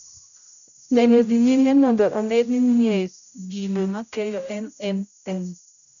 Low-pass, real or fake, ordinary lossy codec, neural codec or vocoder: 7.2 kHz; fake; none; codec, 16 kHz, 0.5 kbps, X-Codec, HuBERT features, trained on general audio